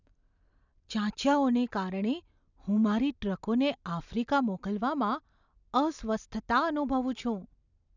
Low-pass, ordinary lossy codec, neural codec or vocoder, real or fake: 7.2 kHz; none; none; real